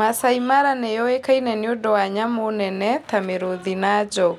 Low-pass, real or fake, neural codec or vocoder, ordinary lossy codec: 19.8 kHz; real; none; none